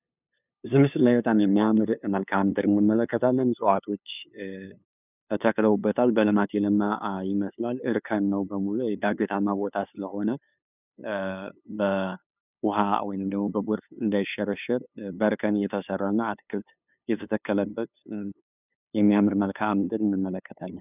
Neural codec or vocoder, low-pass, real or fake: codec, 16 kHz, 2 kbps, FunCodec, trained on LibriTTS, 25 frames a second; 3.6 kHz; fake